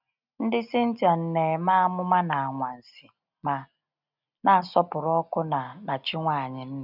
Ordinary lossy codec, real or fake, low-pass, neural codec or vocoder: none; real; 5.4 kHz; none